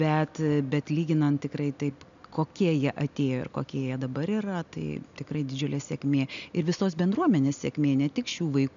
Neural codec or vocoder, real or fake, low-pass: none; real; 7.2 kHz